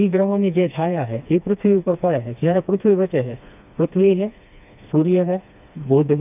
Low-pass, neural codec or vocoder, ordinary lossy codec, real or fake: 3.6 kHz; codec, 16 kHz, 2 kbps, FreqCodec, smaller model; none; fake